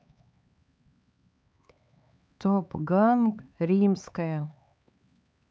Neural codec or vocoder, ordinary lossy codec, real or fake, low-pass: codec, 16 kHz, 4 kbps, X-Codec, HuBERT features, trained on LibriSpeech; none; fake; none